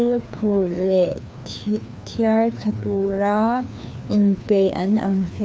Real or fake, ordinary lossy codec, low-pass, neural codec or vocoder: fake; none; none; codec, 16 kHz, 2 kbps, FreqCodec, larger model